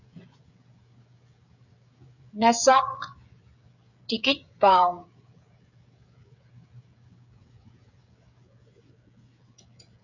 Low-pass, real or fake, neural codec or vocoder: 7.2 kHz; fake; codec, 16 kHz, 16 kbps, FreqCodec, smaller model